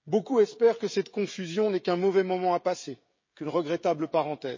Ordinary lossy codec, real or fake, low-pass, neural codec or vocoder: MP3, 32 kbps; fake; 7.2 kHz; codec, 16 kHz, 16 kbps, FreqCodec, smaller model